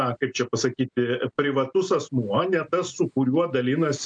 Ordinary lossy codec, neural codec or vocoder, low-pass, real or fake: MP3, 96 kbps; none; 9.9 kHz; real